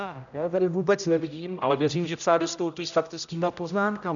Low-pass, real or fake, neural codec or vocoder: 7.2 kHz; fake; codec, 16 kHz, 0.5 kbps, X-Codec, HuBERT features, trained on general audio